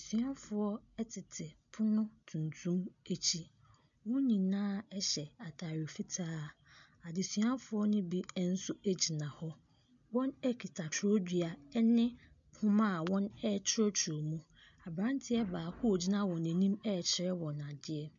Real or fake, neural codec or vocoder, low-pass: real; none; 7.2 kHz